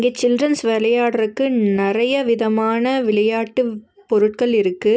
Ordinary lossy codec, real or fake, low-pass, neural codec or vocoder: none; real; none; none